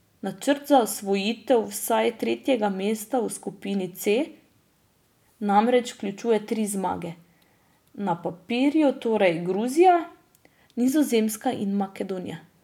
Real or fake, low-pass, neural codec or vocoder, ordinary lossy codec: real; 19.8 kHz; none; none